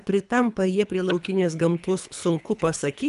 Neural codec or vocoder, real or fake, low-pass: codec, 24 kHz, 3 kbps, HILCodec; fake; 10.8 kHz